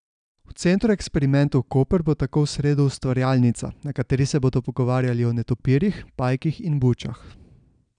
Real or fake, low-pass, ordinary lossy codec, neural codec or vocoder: real; 9.9 kHz; none; none